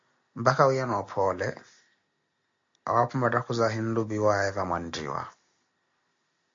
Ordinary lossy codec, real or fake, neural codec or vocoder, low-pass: AAC, 48 kbps; real; none; 7.2 kHz